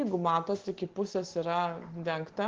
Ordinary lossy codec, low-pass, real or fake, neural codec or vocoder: Opus, 16 kbps; 7.2 kHz; real; none